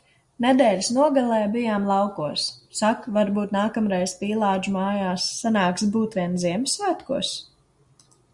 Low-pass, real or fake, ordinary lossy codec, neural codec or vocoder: 10.8 kHz; real; Opus, 64 kbps; none